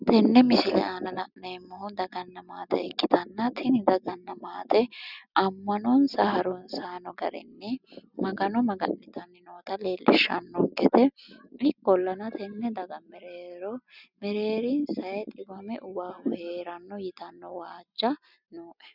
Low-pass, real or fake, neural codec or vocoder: 5.4 kHz; real; none